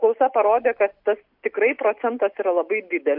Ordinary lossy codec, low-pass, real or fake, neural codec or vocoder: MP3, 48 kbps; 5.4 kHz; real; none